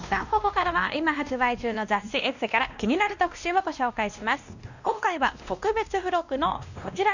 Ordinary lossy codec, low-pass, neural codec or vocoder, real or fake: none; 7.2 kHz; codec, 16 kHz, 1 kbps, X-Codec, WavLM features, trained on Multilingual LibriSpeech; fake